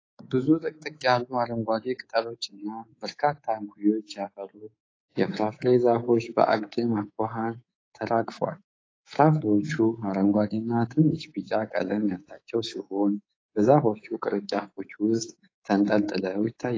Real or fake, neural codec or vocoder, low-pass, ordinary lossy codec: fake; codec, 24 kHz, 3.1 kbps, DualCodec; 7.2 kHz; AAC, 32 kbps